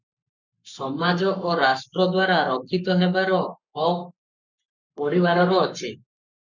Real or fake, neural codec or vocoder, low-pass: fake; codec, 44.1 kHz, 7.8 kbps, Pupu-Codec; 7.2 kHz